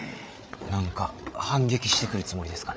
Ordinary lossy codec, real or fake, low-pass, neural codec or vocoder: none; fake; none; codec, 16 kHz, 8 kbps, FreqCodec, larger model